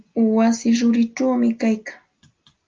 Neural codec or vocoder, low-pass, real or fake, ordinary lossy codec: none; 7.2 kHz; real; Opus, 24 kbps